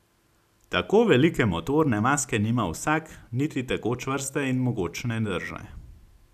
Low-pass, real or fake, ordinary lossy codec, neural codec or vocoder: 14.4 kHz; real; none; none